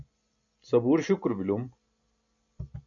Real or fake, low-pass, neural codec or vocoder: real; 7.2 kHz; none